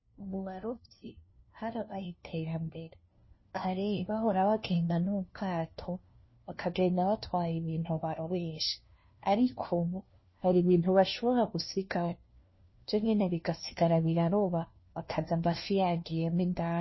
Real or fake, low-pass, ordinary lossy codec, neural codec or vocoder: fake; 7.2 kHz; MP3, 24 kbps; codec, 16 kHz, 1 kbps, FunCodec, trained on LibriTTS, 50 frames a second